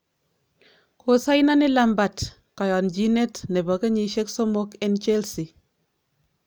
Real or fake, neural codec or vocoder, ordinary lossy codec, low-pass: real; none; none; none